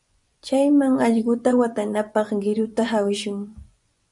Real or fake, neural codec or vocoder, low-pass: fake; vocoder, 24 kHz, 100 mel bands, Vocos; 10.8 kHz